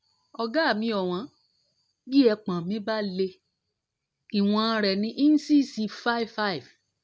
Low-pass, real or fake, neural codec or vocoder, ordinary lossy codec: none; real; none; none